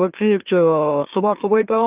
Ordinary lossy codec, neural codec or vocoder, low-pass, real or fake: Opus, 32 kbps; autoencoder, 44.1 kHz, a latent of 192 numbers a frame, MeloTTS; 3.6 kHz; fake